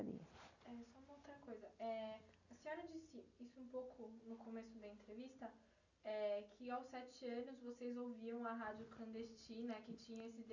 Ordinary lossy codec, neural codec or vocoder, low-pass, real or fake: none; none; 7.2 kHz; real